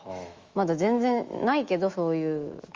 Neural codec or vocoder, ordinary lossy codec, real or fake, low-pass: autoencoder, 48 kHz, 128 numbers a frame, DAC-VAE, trained on Japanese speech; Opus, 32 kbps; fake; 7.2 kHz